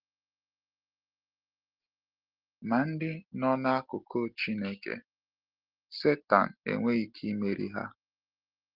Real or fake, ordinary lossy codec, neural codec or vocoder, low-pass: real; Opus, 16 kbps; none; 5.4 kHz